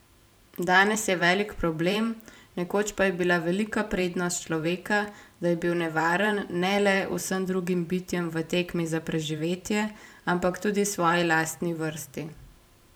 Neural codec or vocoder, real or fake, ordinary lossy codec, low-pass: vocoder, 44.1 kHz, 128 mel bands every 512 samples, BigVGAN v2; fake; none; none